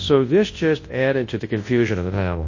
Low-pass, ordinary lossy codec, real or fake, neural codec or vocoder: 7.2 kHz; MP3, 32 kbps; fake; codec, 24 kHz, 0.9 kbps, WavTokenizer, large speech release